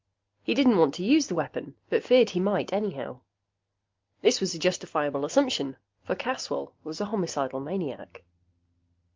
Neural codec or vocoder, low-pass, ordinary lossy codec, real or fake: none; 7.2 kHz; Opus, 24 kbps; real